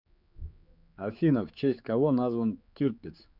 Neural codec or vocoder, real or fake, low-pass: codec, 16 kHz, 4 kbps, X-Codec, HuBERT features, trained on general audio; fake; 5.4 kHz